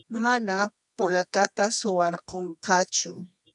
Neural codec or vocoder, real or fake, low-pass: codec, 24 kHz, 0.9 kbps, WavTokenizer, medium music audio release; fake; 10.8 kHz